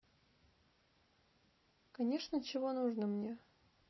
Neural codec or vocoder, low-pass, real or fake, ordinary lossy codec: none; 7.2 kHz; real; MP3, 24 kbps